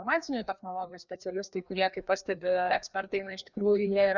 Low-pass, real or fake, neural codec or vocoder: 7.2 kHz; fake; codec, 16 kHz, 2 kbps, FreqCodec, larger model